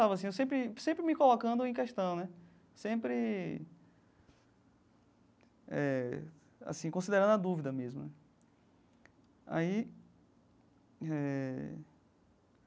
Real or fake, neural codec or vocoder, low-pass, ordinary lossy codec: real; none; none; none